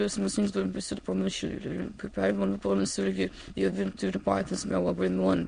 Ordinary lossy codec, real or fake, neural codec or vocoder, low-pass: MP3, 48 kbps; fake; autoencoder, 22.05 kHz, a latent of 192 numbers a frame, VITS, trained on many speakers; 9.9 kHz